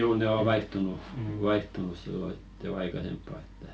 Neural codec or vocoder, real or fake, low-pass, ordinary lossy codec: none; real; none; none